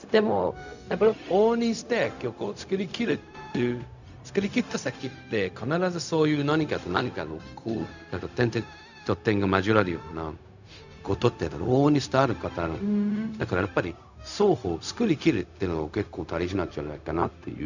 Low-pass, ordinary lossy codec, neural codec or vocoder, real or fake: 7.2 kHz; none; codec, 16 kHz, 0.4 kbps, LongCat-Audio-Codec; fake